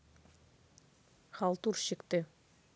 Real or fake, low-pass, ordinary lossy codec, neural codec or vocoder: real; none; none; none